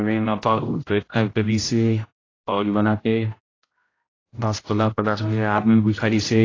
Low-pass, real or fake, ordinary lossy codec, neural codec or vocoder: 7.2 kHz; fake; AAC, 32 kbps; codec, 16 kHz, 0.5 kbps, X-Codec, HuBERT features, trained on general audio